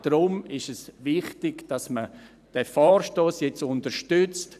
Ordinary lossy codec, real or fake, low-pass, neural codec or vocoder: none; fake; 14.4 kHz; vocoder, 44.1 kHz, 128 mel bands every 512 samples, BigVGAN v2